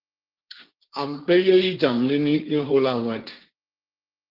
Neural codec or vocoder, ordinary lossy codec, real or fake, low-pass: codec, 16 kHz, 1.1 kbps, Voila-Tokenizer; Opus, 32 kbps; fake; 5.4 kHz